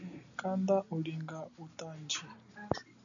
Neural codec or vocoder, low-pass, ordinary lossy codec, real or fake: none; 7.2 kHz; MP3, 96 kbps; real